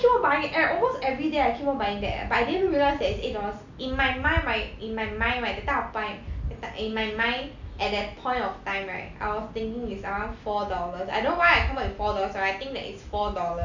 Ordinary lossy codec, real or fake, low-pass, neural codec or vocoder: none; real; 7.2 kHz; none